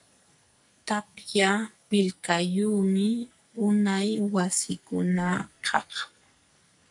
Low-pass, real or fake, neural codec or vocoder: 10.8 kHz; fake; codec, 44.1 kHz, 2.6 kbps, SNAC